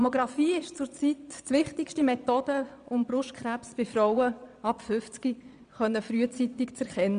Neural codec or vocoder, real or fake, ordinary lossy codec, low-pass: vocoder, 22.05 kHz, 80 mel bands, Vocos; fake; none; 9.9 kHz